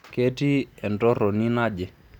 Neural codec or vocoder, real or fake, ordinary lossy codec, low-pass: none; real; none; 19.8 kHz